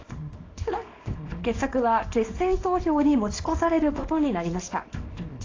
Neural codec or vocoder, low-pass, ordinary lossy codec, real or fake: codec, 24 kHz, 0.9 kbps, WavTokenizer, small release; 7.2 kHz; AAC, 32 kbps; fake